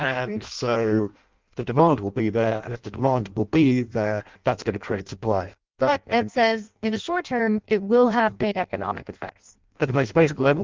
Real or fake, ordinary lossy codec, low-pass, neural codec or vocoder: fake; Opus, 24 kbps; 7.2 kHz; codec, 16 kHz in and 24 kHz out, 0.6 kbps, FireRedTTS-2 codec